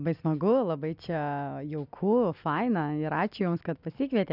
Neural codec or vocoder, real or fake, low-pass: none; real; 5.4 kHz